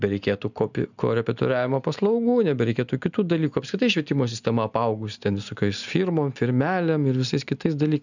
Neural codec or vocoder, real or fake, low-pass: none; real; 7.2 kHz